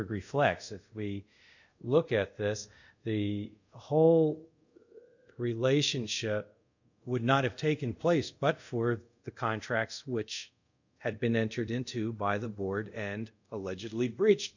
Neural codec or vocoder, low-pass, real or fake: codec, 24 kHz, 0.5 kbps, DualCodec; 7.2 kHz; fake